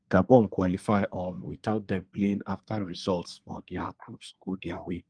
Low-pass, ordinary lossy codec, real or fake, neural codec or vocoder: 9.9 kHz; Opus, 24 kbps; fake; codec, 24 kHz, 1 kbps, SNAC